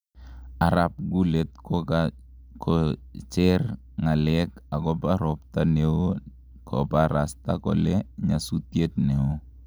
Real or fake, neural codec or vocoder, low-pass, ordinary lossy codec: real; none; none; none